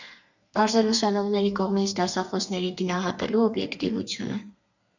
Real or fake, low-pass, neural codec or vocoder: fake; 7.2 kHz; codec, 32 kHz, 1.9 kbps, SNAC